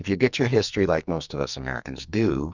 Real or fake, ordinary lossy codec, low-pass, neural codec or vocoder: fake; Opus, 64 kbps; 7.2 kHz; codec, 44.1 kHz, 2.6 kbps, SNAC